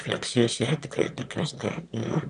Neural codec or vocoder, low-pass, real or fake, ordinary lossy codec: autoencoder, 22.05 kHz, a latent of 192 numbers a frame, VITS, trained on one speaker; 9.9 kHz; fake; AAC, 96 kbps